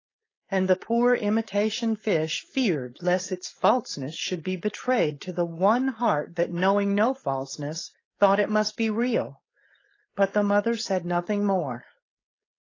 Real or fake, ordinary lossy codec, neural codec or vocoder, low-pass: fake; AAC, 32 kbps; codec, 16 kHz, 4.8 kbps, FACodec; 7.2 kHz